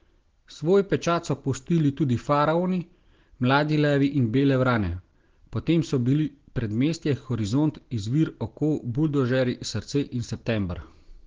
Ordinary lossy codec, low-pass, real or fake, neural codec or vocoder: Opus, 16 kbps; 7.2 kHz; real; none